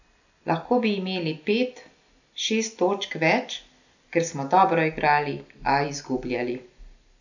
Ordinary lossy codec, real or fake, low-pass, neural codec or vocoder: none; real; 7.2 kHz; none